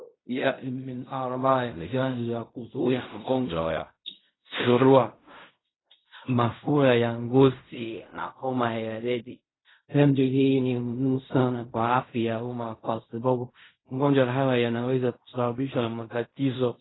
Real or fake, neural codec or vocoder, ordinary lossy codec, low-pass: fake; codec, 16 kHz in and 24 kHz out, 0.4 kbps, LongCat-Audio-Codec, fine tuned four codebook decoder; AAC, 16 kbps; 7.2 kHz